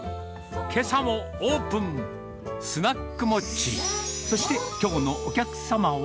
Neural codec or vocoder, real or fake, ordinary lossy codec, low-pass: none; real; none; none